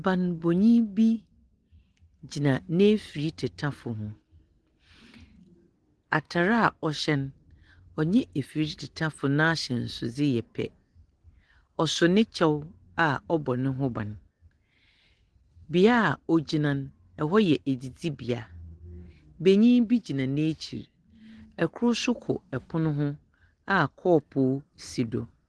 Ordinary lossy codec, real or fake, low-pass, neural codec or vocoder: Opus, 16 kbps; real; 10.8 kHz; none